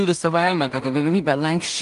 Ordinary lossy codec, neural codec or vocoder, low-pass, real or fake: Opus, 32 kbps; codec, 16 kHz in and 24 kHz out, 0.4 kbps, LongCat-Audio-Codec, two codebook decoder; 10.8 kHz; fake